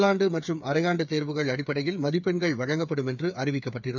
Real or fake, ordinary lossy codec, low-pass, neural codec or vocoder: fake; none; 7.2 kHz; codec, 16 kHz, 8 kbps, FreqCodec, smaller model